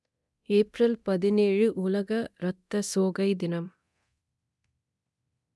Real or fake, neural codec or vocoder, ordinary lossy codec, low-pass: fake; codec, 24 kHz, 0.9 kbps, DualCodec; none; none